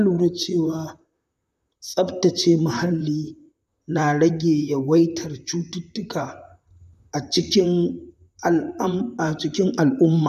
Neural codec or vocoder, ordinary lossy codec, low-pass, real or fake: vocoder, 44.1 kHz, 128 mel bands, Pupu-Vocoder; none; 14.4 kHz; fake